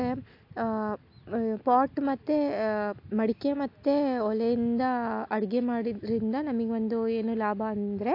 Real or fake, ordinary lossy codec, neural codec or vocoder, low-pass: real; AAC, 32 kbps; none; 5.4 kHz